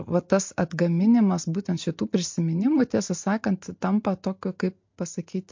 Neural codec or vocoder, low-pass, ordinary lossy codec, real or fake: none; 7.2 kHz; MP3, 48 kbps; real